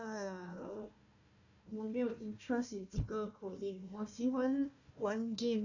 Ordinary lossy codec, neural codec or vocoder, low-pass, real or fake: none; codec, 16 kHz, 1 kbps, FunCodec, trained on Chinese and English, 50 frames a second; 7.2 kHz; fake